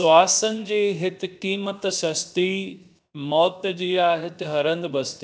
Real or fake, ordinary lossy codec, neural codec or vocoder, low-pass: fake; none; codec, 16 kHz, 0.7 kbps, FocalCodec; none